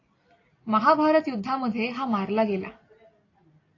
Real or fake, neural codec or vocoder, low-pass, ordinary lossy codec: real; none; 7.2 kHz; AAC, 32 kbps